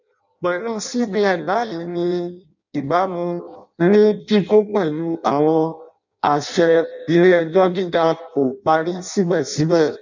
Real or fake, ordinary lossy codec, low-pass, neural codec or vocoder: fake; none; 7.2 kHz; codec, 16 kHz in and 24 kHz out, 0.6 kbps, FireRedTTS-2 codec